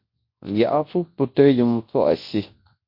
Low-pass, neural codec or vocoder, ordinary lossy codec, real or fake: 5.4 kHz; codec, 24 kHz, 0.9 kbps, WavTokenizer, large speech release; MP3, 32 kbps; fake